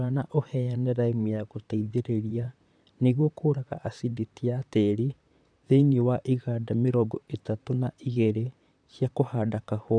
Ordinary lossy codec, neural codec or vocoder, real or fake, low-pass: none; vocoder, 44.1 kHz, 128 mel bands, Pupu-Vocoder; fake; 9.9 kHz